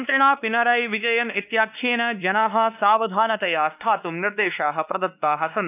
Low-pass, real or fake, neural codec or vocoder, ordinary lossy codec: 3.6 kHz; fake; codec, 16 kHz, 2 kbps, X-Codec, WavLM features, trained on Multilingual LibriSpeech; none